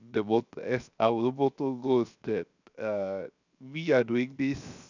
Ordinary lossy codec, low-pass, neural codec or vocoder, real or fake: none; 7.2 kHz; codec, 16 kHz, 0.7 kbps, FocalCodec; fake